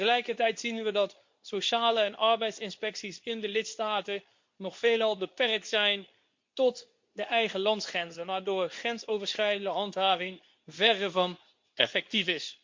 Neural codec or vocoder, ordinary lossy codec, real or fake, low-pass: codec, 24 kHz, 0.9 kbps, WavTokenizer, medium speech release version 2; MP3, 48 kbps; fake; 7.2 kHz